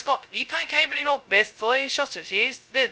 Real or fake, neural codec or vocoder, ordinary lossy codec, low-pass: fake; codec, 16 kHz, 0.2 kbps, FocalCodec; none; none